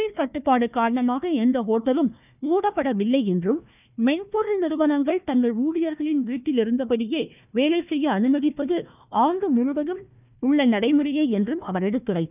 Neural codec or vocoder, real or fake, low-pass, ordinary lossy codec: codec, 16 kHz, 1 kbps, FunCodec, trained on Chinese and English, 50 frames a second; fake; 3.6 kHz; none